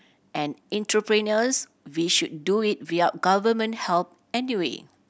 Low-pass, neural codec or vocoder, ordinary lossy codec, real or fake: none; none; none; real